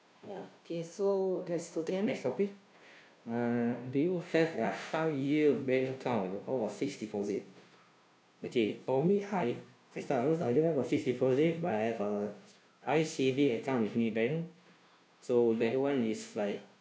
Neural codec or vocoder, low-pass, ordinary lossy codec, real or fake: codec, 16 kHz, 0.5 kbps, FunCodec, trained on Chinese and English, 25 frames a second; none; none; fake